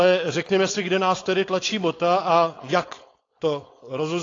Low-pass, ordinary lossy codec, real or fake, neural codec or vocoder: 7.2 kHz; AAC, 32 kbps; fake; codec, 16 kHz, 4.8 kbps, FACodec